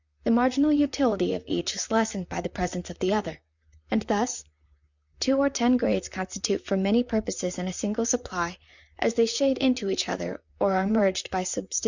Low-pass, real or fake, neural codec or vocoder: 7.2 kHz; fake; vocoder, 44.1 kHz, 128 mel bands, Pupu-Vocoder